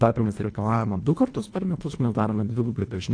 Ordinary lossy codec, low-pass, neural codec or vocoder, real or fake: AAC, 48 kbps; 9.9 kHz; codec, 24 kHz, 1.5 kbps, HILCodec; fake